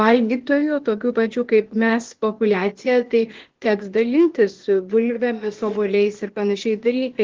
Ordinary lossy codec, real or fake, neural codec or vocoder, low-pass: Opus, 16 kbps; fake; codec, 16 kHz, 0.8 kbps, ZipCodec; 7.2 kHz